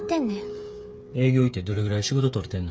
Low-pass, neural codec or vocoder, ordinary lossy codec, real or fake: none; codec, 16 kHz, 8 kbps, FreqCodec, smaller model; none; fake